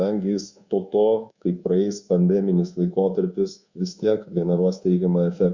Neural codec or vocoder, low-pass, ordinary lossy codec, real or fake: codec, 24 kHz, 1.2 kbps, DualCodec; 7.2 kHz; Opus, 64 kbps; fake